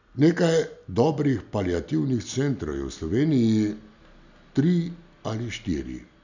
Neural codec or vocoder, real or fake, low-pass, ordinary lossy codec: none; real; 7.2 kHz; none